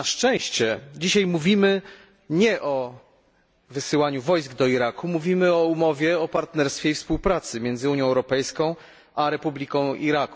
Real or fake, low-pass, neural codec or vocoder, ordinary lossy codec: real; none; none; none